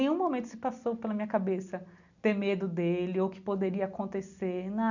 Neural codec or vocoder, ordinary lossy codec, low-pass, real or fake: none; none; 7.2 kHz; real